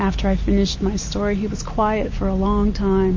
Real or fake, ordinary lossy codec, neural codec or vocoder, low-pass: real; MP3, 32 kbps; none; 7.2 kHz